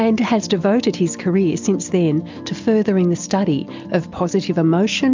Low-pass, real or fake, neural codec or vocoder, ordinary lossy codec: 7.2 kHz; real; none; MP3, 64 kbps